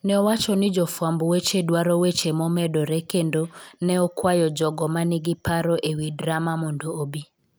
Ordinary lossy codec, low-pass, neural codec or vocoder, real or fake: none; none; none; real